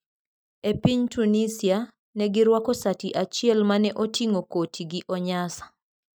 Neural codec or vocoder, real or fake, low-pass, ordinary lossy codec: none; real; none; none